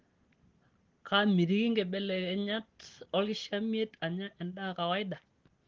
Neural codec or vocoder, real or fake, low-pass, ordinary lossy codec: none; real; 7.2 kHz; Opus, 16 kbps